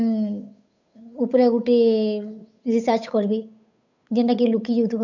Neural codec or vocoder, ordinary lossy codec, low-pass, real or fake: codec, 16 kHz, 8 kbps, FunCodec, trained on Chinese and English, 25 frames a second; none; 7.2 kHz; fake